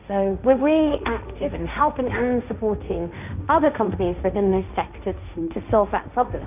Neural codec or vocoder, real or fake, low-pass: codec, 16 kHz, 1.1 kbps, Voila-Tokenizer; fake; 3.6 kHz